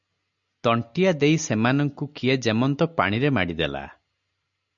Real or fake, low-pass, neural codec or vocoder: real; 7.2 kHz; none